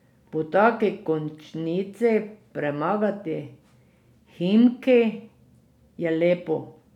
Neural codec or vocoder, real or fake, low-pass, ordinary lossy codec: none; real; 19.8 kHz; none